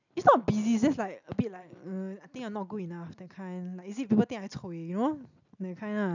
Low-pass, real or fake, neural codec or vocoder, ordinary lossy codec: 7.2 kHz; real; none; none